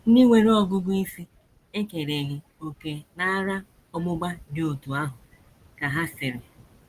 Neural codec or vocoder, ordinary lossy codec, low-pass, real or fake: none; Opus, 24 kbps; 14.4 kHz; real